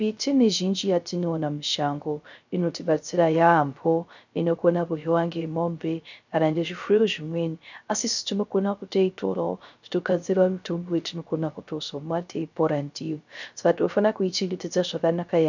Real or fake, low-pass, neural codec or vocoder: fake; 7.2 kHz; codec, 16 kHz, 0.3 kbps, FocalCodec